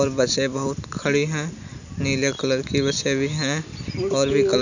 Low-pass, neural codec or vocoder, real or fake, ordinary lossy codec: 7.2 kHz; none; real; none